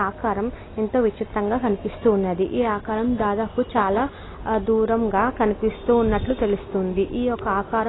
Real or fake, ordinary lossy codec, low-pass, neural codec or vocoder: real; AAC, 16 kbps; 7.2 kHz; none